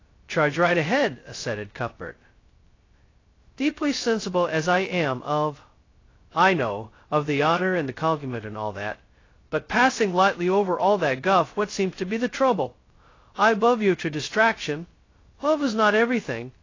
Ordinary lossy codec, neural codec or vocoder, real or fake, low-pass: AAC, 32 kbps; codec, 16 kHz, 0.2 kbps, FocalCodec; fake; 7.2 kHz